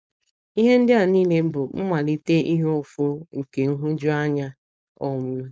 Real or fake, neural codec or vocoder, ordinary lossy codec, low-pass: fake; codec, 16 kHz, 4.8 kbps, FACodec; none; none